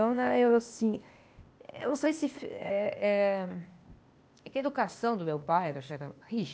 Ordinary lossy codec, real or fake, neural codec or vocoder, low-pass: none; fake; codec, 16 kHz, 0.8 kbps, ZipCodec; none